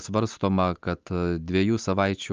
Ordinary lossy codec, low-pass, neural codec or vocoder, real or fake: Opus, 24 kbps; 7.2 kHz; none; real